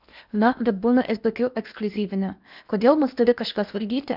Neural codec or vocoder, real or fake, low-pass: codec, 16 kHz in and 24 kHz out, 0.8 kbps, FocalCodec, streaming, 65536 codes; fake; 5.4 kHz